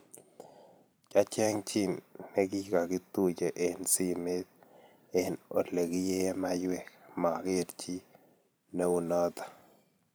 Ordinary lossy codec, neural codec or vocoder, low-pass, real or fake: none; vocoder, 44.1 kHz, 128 mel bands every 512 samples, BigVGAN v2; none; fake